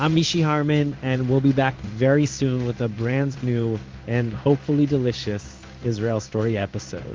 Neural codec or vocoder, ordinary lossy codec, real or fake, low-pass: codec, 16 kHz in and 24 kHz out, 1 kbps, XY-Tokenizer; Opus, 24 kbps; fake; 7.2 kHz